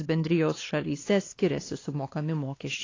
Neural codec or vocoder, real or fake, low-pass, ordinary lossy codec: codec, 16 kHz, 2 kbps, X-Codec, WavLM features, trained on Multilingual LibriSpeech; fake; 7.2 kHz; AAC, 32 kbps